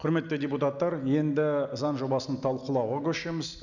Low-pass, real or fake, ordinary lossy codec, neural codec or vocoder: 7.2 kHz; real; none; none